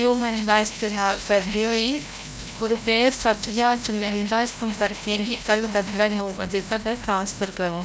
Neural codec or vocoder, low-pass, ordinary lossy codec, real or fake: codec, 16 kHz, 0.5 kbps, FreqCodec, larger model; none; none; fake